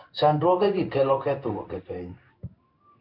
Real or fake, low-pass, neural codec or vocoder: fake; 5.4 kHz; codec, 16 kHz in and 24 kHz out, 1 kbps, XY-Tokenizer